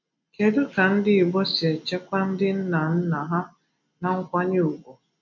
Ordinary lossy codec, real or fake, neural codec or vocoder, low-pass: none; real; none; 7.2 kHz